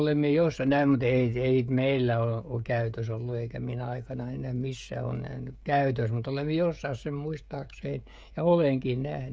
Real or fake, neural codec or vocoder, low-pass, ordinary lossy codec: fake; codec, 16 kHz, 16 kbps, FreqCodec, smaller model; none; none